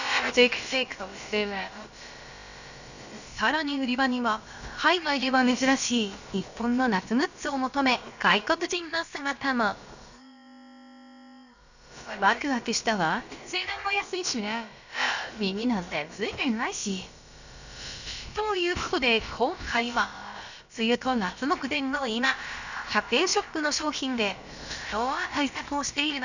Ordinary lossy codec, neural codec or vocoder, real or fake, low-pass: none; codec, 16 kHz, about 1 kbps, DyCAST, with the encoder's durations; fake; 7.2 kHz